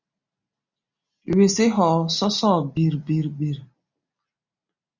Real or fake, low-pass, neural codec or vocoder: real; 7.2 kHz; none